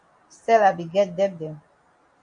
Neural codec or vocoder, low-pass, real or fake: none; 9.9 kHz; real